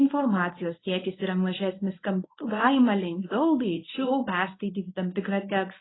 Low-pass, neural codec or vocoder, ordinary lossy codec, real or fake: 7.2 kHz; codec, 24 kHz, 0.9 kbps, WavTokenizer, medium speech release version 1; AAC, 16 kbps; fake